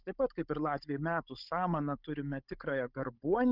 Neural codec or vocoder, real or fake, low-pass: codec, 16 kHz, 16 kbps, FunCodec, trained on Chinese and English, 50 frames a second; fake; 5.4 kHz